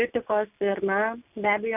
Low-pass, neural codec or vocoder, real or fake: 3.6 kHz; none; real